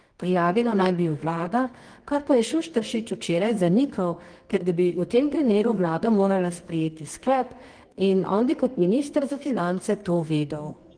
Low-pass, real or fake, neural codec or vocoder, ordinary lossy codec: 9.9 kHz; fake; codec, 24 kHz, 0.9 kbps, WavTokenizer, medium music audio release; Opus, 24 kbps